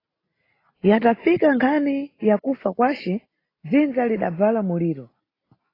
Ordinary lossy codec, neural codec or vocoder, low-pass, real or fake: AAC, 24 kbps; none; 5.4 kHz; real